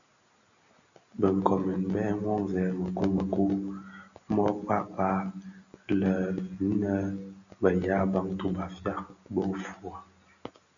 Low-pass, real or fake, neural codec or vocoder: 7.2 kHz; real; none